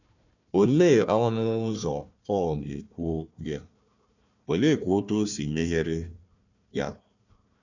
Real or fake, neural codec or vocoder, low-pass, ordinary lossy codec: fake; codec, 16 kHz, 1 kbps, FunCodec, trained on Chinese and English, 50 frames a second; 7.2 kHz; none